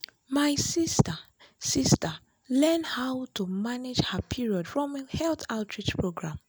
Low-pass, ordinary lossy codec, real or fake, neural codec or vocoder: none; none; real; none